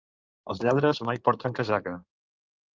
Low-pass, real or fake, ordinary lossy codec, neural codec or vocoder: 7.2 kHz; fake; Opus, 32 kbps; codec, 16 kHz in and 24 kHz out, 2.2 kbps, FireRedTTS-2 codec